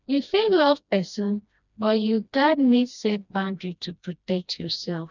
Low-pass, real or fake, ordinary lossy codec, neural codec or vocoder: 7.2 kHz; fake; none; codec, 16 kHz, 1 kbps, FreqCodec, smaller model